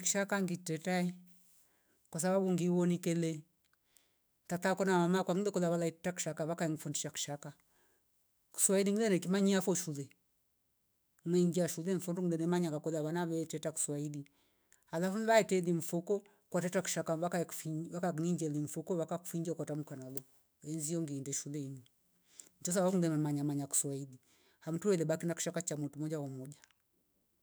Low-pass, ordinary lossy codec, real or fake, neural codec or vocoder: none; none; fake; autoencoder, 48 kHz, 128 numbers a frame, DAC-VAE, trained on Japanese speech